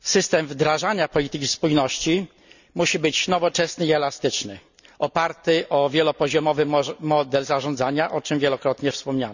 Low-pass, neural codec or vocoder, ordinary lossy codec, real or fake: 7.2 kHz; none; none; real